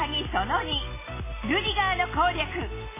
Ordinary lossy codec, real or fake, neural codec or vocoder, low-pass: MP3, 16 kbps; real; none; 3.6 kHz